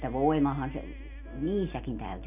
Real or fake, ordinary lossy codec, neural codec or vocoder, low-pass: real; none; none; 3.6 kHz